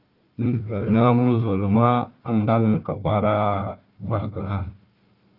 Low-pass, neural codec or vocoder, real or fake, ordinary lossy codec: 5.4 kHz; codec, 16 kHz, 1 kbps, FunCodec, trained on Chinese and English, 50 frames a second; fake; Opus, 24 kbps